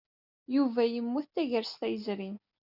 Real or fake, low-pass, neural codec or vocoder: real; 5.4 kHz; none